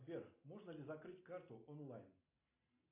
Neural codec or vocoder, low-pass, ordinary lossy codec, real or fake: none; 3.6 kHz; AAC, 32 kbps; real